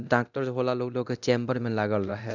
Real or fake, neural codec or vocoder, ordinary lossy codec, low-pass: fake; codec, 24 kHz, 0.9 kbps, DualCodec; none; 7.2 kHz